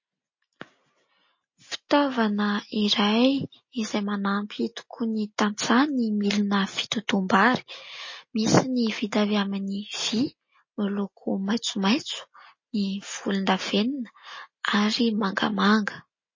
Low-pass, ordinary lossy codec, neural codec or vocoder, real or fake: 7.2 kHz; MP3, 32 kbps; none; real